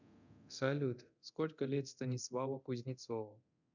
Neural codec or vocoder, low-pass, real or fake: codec, 24 kHz, 0.9 kbps, DualCodec; 7.2 kHz; fake